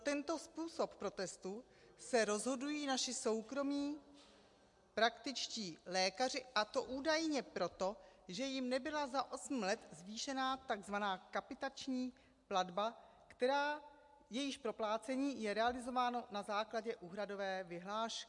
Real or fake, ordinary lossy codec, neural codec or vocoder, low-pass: real; MP3, 64 kbps; none; 10.8 kHz